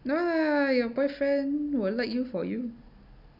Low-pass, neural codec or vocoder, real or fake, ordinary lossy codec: 5.4 kHz; none; real; none